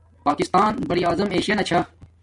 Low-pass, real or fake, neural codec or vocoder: 10.8 kHz; real; none